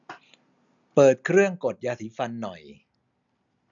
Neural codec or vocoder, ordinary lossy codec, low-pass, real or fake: none; none; 7.2 kHz; real